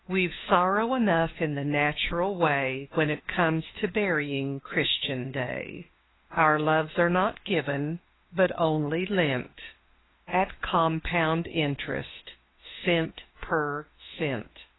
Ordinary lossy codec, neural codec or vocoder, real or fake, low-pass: AAC, 16 kbps; autoencoder, 48 kHz, 32 numbers a frame, DAC-VAE, trained on Japanese speech; fake; 7.2 kHz